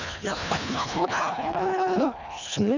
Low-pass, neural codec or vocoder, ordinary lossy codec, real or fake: 7.2 kHz; codec, 24 kHz, 1.5 kbps, HILCodec; none; fake